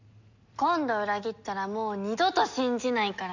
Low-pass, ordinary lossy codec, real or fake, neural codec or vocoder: 7.2 kHz; none; real; none